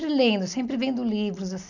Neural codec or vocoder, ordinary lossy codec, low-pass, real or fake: none; none; 7.2 kHz; real